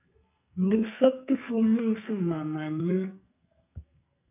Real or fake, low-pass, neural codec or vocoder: fake; 3.6 kHz; codec, 44.1 kHz, 2.6 kbps, SNAC